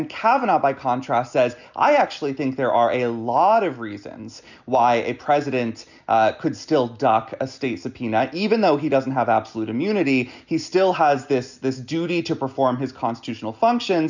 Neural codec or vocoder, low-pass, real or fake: none; 7.2 kHz; real